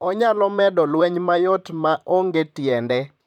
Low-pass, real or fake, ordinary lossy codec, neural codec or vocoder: 19.8 kHz; fake; none; vocoder, 44.1 kHz, 128 mel bands, Pupu-Vocoder